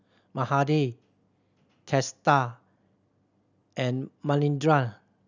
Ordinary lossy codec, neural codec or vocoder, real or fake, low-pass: none; none; real; 7.2 kHz